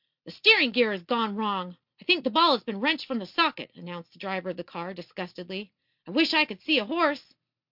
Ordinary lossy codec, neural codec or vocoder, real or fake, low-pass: MP3, 48 kbps; none; real; 5.4 kHz